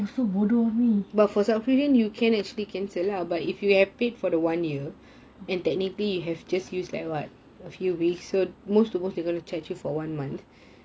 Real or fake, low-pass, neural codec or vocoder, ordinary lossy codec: real; none; none; none